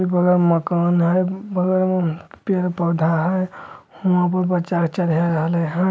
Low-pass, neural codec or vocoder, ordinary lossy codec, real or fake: none; none; none; real